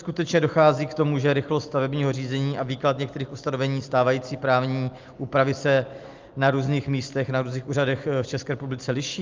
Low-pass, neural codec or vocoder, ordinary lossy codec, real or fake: 7.2 kHz; none; Opus, 24 kbps; real